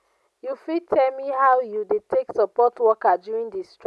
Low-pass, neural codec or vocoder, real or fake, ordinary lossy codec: none; none; real; none